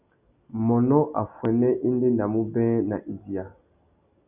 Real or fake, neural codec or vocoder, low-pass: real; none; 3.6 kHz